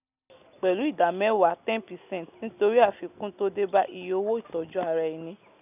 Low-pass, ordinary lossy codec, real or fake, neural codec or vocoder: 3.6 kHz; none; real; none